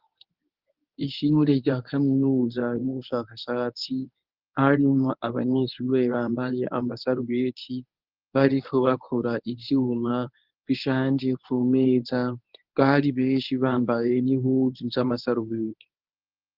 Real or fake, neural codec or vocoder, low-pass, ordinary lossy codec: fake; codec, 24 kHz, 0.9 kbps, WavTokenizer, medium speech release version 1; 5.4 kHz; Opus, 24 kbps